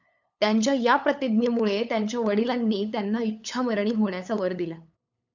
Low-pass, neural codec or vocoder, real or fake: 7.2 kHz; codec, 16 kHz, 8 kbps, FunCodec, trained on LibriTTS, 25 frames a second; fake